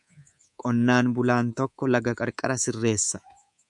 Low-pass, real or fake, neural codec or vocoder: 10.8 kHz; fake; codec, 24 kHz, 3.1 kbps, DualCodec